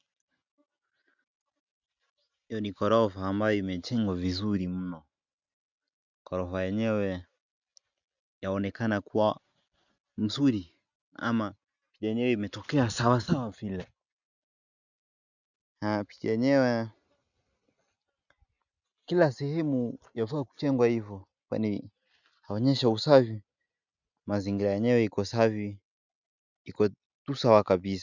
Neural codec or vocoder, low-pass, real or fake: none; 7.2 kHz; real